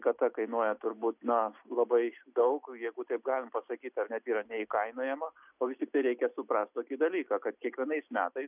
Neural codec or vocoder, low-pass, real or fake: none; 3.6 kHz; real